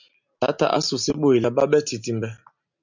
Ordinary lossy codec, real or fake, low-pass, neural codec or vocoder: MP3, 64 kbps; real; 7.2 kHz; none